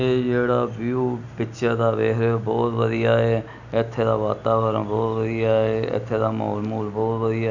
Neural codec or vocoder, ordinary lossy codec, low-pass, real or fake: none; none; 7.2 kHz; real